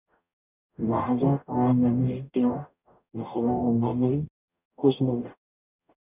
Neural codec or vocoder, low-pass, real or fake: codec, 44.1 kHz, 0.9 kbps, DAC; 3.6 kHz; fake